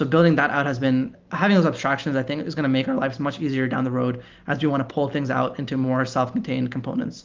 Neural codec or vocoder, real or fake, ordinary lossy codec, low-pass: none; real; Opus, 32 kbps; 7.2 kHz